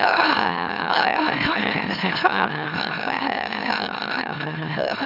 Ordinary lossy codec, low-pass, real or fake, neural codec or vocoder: none; 5.4 kHz; fake; autoencoder, 44.1 kHz, a latent of 192 numbers a frame, MeloTTS